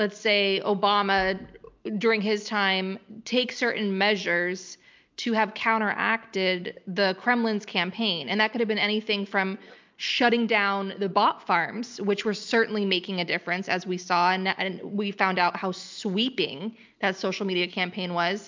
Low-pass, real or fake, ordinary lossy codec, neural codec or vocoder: 7.2 kHz; real; MP3, 64 kbps; none